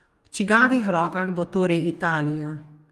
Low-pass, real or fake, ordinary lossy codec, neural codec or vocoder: 14.4 kHz; fake; Opus, 32 kbps; codec, 44.1 kHz, 2.6 kbps, DAC